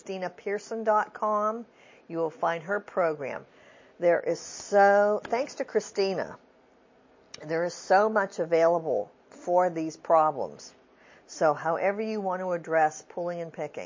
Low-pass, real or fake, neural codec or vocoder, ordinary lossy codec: 7.2 kHz; real; none; MP3, 32 kbps